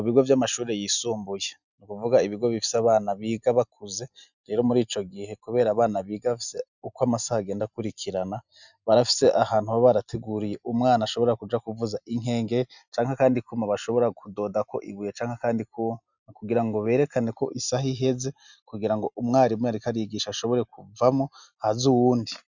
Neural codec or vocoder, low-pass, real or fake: none; 7.2 kHz; real